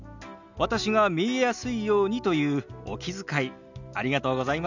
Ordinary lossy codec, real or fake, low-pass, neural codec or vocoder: none; real; 7.2 kHz; none